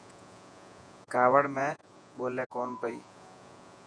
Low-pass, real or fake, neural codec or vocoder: 9.9 kHz; fake; vocoder, 48 kHz, 128 mel bands, Vocos